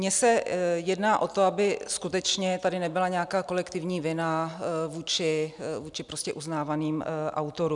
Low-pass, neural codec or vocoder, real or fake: 10.8 kHz; none; real